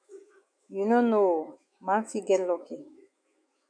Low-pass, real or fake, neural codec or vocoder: 9.9 kHz; fake; autoencoder, 48 kHz, 128 numbers a frame, DAC-VAE, trained on Japanese speech